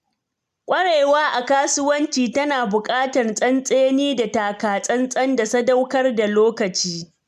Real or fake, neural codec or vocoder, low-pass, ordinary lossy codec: real; none; 14.4 kHz; MP3, 96 kbps